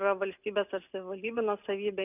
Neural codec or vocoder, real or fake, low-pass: none; real; 3.6 kHz